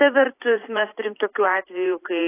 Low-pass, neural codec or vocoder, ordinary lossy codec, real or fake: 3.6 kHz; vocoder, 44.1 kHz, 128 mel bands every 512 samples, BigVGAN v2; AAC, 24 kbps; fake